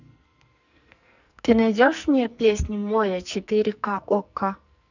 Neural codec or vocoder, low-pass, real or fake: codec, 44.1 kHz, 2.6 kbps, SNAC; 7.2 kHz; fake